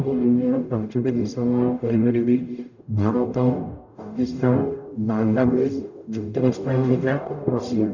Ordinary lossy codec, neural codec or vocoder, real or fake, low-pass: none; codec, 44.1 kHz, 0.9 kbps, DAC; fake; 7.2 kHz